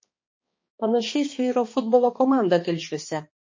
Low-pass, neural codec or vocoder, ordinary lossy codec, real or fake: 7.2 kHz; codec, 16 kHz, 2 kbps, X-Codec, HuBERT features, trained on balanced general audio; MP3, 32 kbps; fake